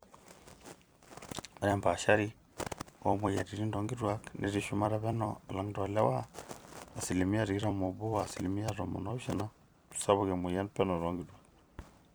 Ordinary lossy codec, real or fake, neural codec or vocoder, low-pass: none; fake; vocoder, 44.1 kHz, 128 mel bands every 256 samples, BigVGAN v2; none